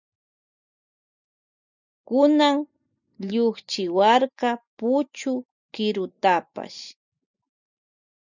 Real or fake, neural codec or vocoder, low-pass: real; none; 7.2 kHz